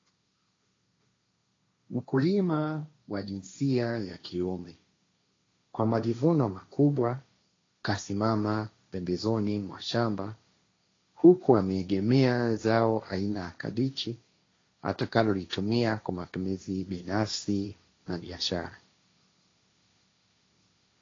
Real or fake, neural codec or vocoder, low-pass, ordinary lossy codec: fake; codec, 16 kHz, 1.1 kbps, Voila-Tokenizer; 7.2 kHz; AAC, 32 kbps